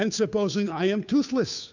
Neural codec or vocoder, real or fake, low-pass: codec, 16 kHz, 2 kbps, FunCodec, trained on Chinese and English, 25 frames a second; fake; 7.2 kHz